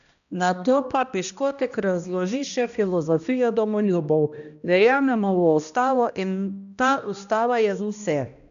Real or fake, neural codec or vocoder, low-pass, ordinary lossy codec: fake; codec, 16 kHz, 1 kbps, X-Codec, HuBERT features, trained on balanced general audio; 7.2 kHz; none